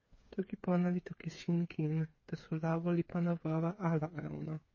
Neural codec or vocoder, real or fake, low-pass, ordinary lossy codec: codec, 16 kHz, 8 kbps, FreqCodec, smaller model; fake; 7.2 kHz; MP3, 32 kbps